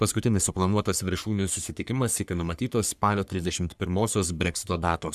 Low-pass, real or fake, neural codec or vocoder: 14.4 kHz; fake; codec, 44.1 kHz, 3.4 kbps, Pupu-Codec